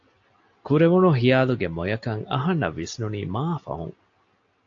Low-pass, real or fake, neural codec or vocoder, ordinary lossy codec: 7.2 kHz; real; none; AAC, 48 kbps